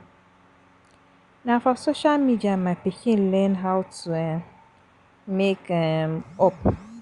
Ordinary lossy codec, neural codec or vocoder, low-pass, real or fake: Opus, 64 kbps; none; 10.8 kHz; real